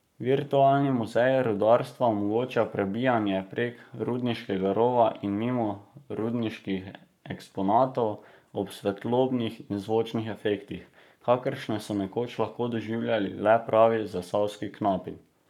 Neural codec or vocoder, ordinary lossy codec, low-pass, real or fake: codec, 44.1 kHz, 7.8 kbps, Pupu-Codec; none; 19.8 kHz; fake